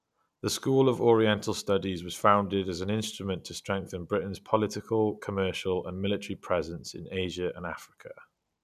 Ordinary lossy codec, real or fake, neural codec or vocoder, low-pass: none; real; none; 14.4 kHz